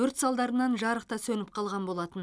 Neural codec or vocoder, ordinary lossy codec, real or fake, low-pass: none; none; real; none